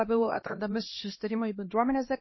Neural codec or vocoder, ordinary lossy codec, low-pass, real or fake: codec, 16 kHz, 1 kbps, X-Codec, HuBERT features, trained on LibriSpeech; MP3, 24 kbps; 7.2 kHz; fake